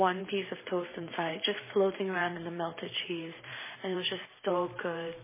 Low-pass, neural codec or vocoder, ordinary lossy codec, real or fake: 3.6 kHz; vocoder, 44.1 kHz, 128 mel bands every 512 samples, BigVGAN v2; MP3, 16 kbps; fake